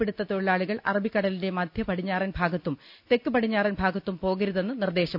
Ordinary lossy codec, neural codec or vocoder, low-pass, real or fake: none; none; 5.4 kHz; real